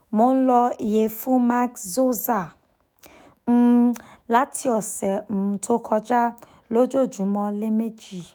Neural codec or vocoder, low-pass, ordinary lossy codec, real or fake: autoencoder, 48 kHz, 128 numbers a frame, DAC-VAE, trained on Japanese speech; none; none; fake